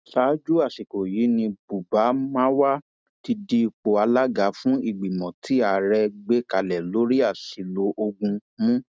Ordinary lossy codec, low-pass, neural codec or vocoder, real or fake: none; none; none; real